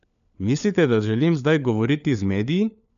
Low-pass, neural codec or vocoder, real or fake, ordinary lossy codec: 7.2 kHz; codec, 16 kHz, 4 kbps, FunCodec, trained on LibriTTS, 50 frames a second; fake; none